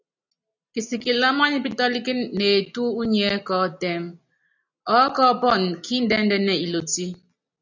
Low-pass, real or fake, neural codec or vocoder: 7.2 kHz; real; none